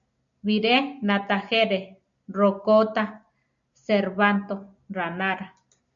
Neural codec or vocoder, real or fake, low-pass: none; real; 7.2 kHz